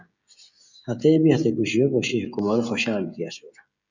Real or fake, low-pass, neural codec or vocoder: fake; 7.2 kHz; codec, 16 kHz, 16 kbps, FreqCodec, smaller model